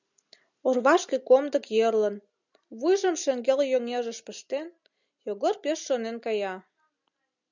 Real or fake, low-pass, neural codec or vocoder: real; 7.2 kHz; none